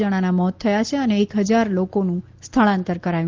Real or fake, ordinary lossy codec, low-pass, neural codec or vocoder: real; Opus, 16 kbps; 7.2 kHz; none